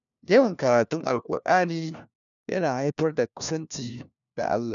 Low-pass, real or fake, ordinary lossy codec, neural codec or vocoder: 7.2 kHz; fake; none; codec, 16 kHz, 1 kbps, FunCodec, trained on LibriTTS, 50 frames a second